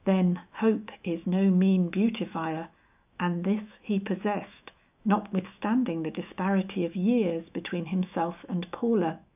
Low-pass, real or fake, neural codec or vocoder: 3.6 kHz; fake; autoencoder, 48 kHz, 128 numbers a frame, DAC-VAE, trained on Japanese speech